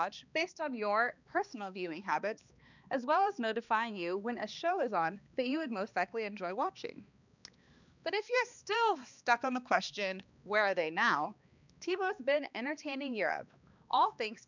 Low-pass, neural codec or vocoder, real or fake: 7.2 kHz; codec, 16 kHz, 2 kbps, X-Codec, HuBERT features, trained on balanced general audio; fake